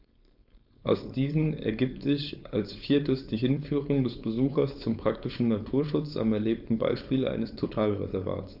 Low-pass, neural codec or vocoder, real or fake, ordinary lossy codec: 5.4 kHz; codec, 16 kHz, 4.8 kbps, FACodec; fake; none